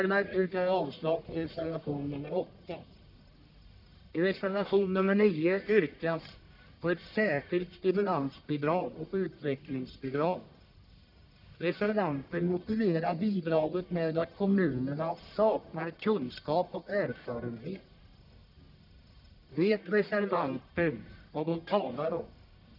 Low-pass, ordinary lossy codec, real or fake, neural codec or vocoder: 5.4 kHz; none; fake; codec, 44.1 kHz, 1.7 kbps, Pupu-Codec